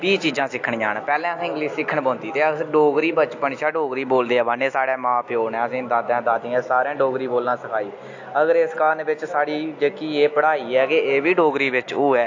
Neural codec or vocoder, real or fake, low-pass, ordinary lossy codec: none; real; 7.2 kHz; AAC, 48 kbps